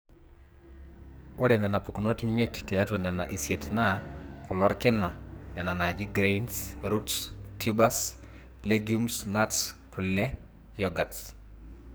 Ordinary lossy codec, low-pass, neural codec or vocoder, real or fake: none; none; codec, 44.1 kHz, 2.6 kbps, SNAC; fake